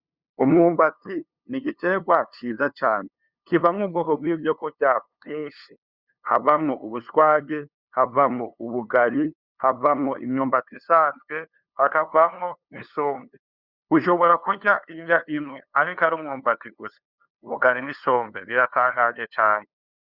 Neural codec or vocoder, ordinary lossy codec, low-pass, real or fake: codec, 16 kHz, 2 kbps, FunCodec, trained on LibriTTS, 25 frames a second; Opus, 64 kbps; 5.4 kHz; fake